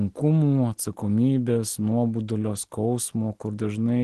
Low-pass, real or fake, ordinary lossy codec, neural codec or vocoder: 10.8 kHz; real; Opus, 16 kbps; none